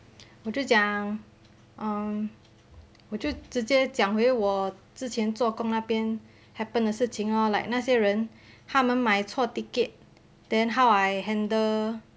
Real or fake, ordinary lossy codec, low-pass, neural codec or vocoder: real; none; none; none